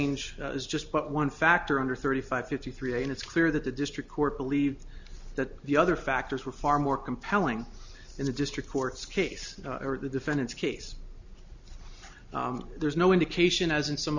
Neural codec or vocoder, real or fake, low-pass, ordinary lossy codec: none; real; 7.2 kHz; Opus, 64 kbps